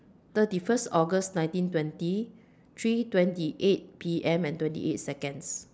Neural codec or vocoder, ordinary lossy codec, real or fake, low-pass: none; none; real; none